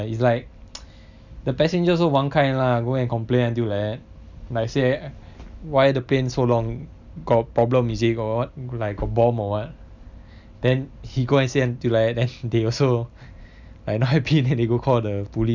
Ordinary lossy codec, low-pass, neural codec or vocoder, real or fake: none; 7.2 kHz; none; real